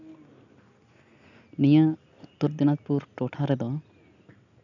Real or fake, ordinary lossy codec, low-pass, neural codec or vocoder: real; none; 7.2 kHz; none